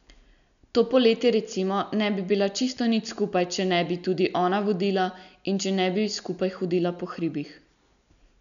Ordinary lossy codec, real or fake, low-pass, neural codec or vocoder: none; real; 7.2 kHz; none